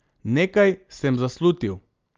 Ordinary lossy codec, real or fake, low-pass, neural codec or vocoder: Opus, 24 kbps; real; 7.2 kHz; none